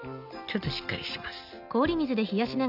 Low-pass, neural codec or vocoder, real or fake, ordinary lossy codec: 5.4 kHz; none; real; none